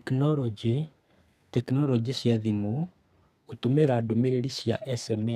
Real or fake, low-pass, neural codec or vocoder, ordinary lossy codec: fake; 14.4 kHz; codec, 32 kHz, 1.9 kbps, SNAC; none